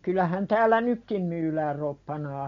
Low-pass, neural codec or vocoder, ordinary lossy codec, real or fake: 7.2 kHz; none; MP3, 48 kbps; real